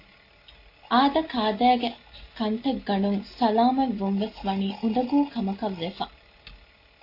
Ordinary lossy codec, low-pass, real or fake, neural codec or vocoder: AAC, 32 kbps; 5.4 kHz; real; none